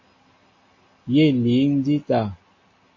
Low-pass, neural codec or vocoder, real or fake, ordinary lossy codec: 7.2 kHz; none; real; MP3, 32 kbps